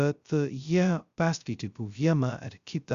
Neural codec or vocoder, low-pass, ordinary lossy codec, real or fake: codec, 16 kHz, 0.2 kbps, FocalCodec; 7.2 kHz; Opus, 64 kbps; fake